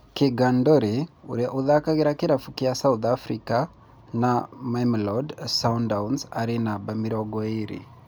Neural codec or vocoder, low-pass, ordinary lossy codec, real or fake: none; none; none; real